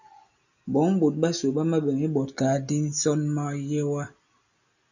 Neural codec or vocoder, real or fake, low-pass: none; real; 7.2 kHz